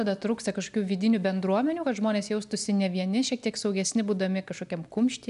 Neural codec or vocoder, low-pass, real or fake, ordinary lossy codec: none; 10.8 kHz; real; AAC, 96 kbps